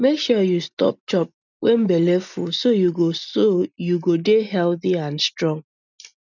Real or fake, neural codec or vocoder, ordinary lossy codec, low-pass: real; none; none; 7.2 kHz